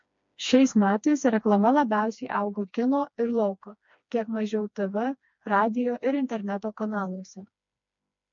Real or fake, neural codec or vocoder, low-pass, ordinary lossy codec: fake; codec, 16 kHz, 2 kbps, FreqCodec, smaller model; 7.2 kHz; MP3, 48 kbps